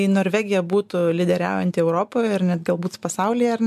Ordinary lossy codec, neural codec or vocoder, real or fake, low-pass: MP3, 96 kbps; none; real; 14.4 kHz